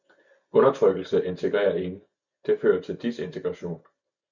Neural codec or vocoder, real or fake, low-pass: none; real; 7.2 kHz